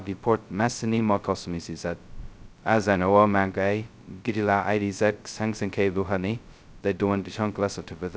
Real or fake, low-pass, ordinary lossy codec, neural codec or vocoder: fake; none; none; codec, 16 kHz, 0.2 kbps, FocalCodec